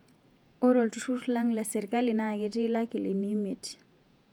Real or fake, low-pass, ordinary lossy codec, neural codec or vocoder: fake; 19.8 kHz; none; vocoder, 48 kHz, 128 mel bands, Vocos